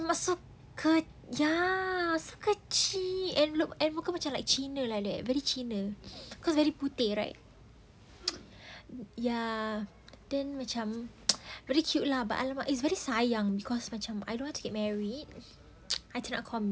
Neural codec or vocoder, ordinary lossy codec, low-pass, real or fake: none; none; none; real